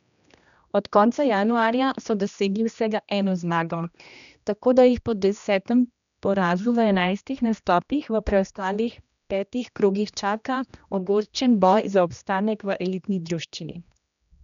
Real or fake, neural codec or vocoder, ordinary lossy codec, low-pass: fake; codec, 16 kHz, 1 kbps, X-Codec, HuBERT features, trained on general audio; none; 7.2 kHz